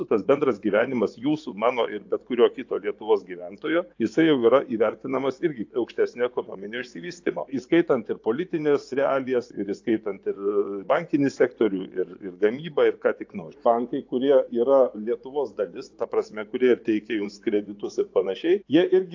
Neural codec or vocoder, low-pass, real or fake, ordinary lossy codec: vocoder, 24 kHz, 100 mel bands, Vocos; 7.2 kHz; fake; AAC, 48 kbps